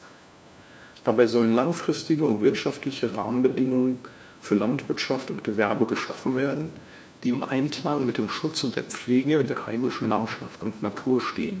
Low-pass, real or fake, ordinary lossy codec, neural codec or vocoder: none; fake; none; codec, 16 kHz, 1 kbps, FunCodec, trained on LibriTTS, 50 frames a second